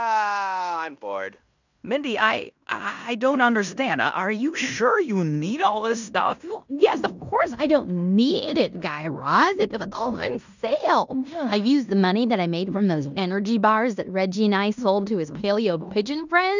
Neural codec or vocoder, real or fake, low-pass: codec, 16 kHz in and 24 kHz out, 0.9 kbps, LongCat-Audio-Codec, fine tuned four codebook decoder; fake; 7.2 kHz